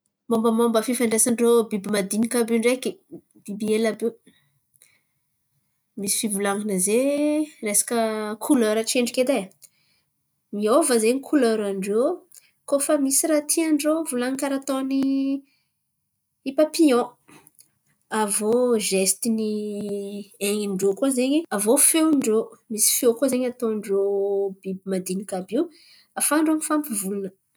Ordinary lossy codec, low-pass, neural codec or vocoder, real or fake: none; none; none; real